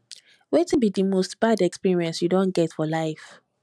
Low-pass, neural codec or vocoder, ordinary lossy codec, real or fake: none; none; none; real